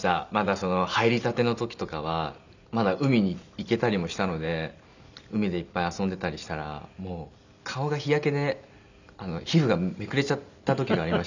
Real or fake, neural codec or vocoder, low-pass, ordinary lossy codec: real; none; 7.2 kHz; none